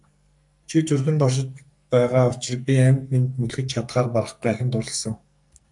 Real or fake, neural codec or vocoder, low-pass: fake; codec, 44.1 kHz, 2.6 kbps, SNAC; 10.8 kHz